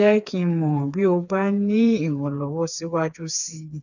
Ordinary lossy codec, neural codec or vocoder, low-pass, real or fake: none; codec, 16 kHz, 4 kbps, FreqCodec, smaller model; 7.2 kHz; fake